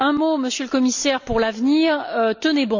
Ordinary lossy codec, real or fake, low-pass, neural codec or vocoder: none; real; 7.2 kHz; none